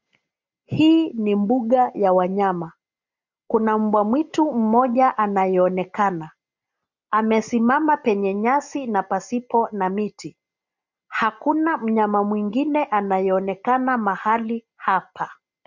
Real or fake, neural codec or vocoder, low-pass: real; none; 7.2 kHz